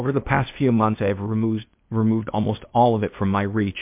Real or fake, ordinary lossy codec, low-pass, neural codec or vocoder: fake; MP3, 32 kbps; 3.6 kHz; codec, 16 kHz, 0.8 kbps, ZipCodec